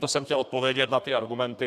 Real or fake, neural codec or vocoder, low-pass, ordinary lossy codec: fake; codec, 32 kHz, 1.9 kbps, SNAC; 14.4 kHz; AAC, 96 kbps